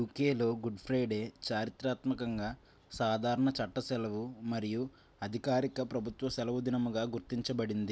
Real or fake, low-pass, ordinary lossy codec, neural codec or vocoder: real; none; none; none